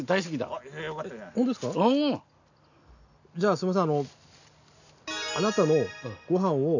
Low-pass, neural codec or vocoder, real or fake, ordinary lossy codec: 7.2 kHz; none; real; none